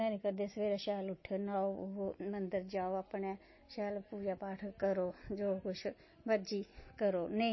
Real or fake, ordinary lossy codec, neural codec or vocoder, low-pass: real; MP3, 24 kbps; none; 7.2 kHz